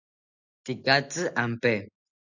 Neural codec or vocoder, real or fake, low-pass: none; real; 7.2 kHz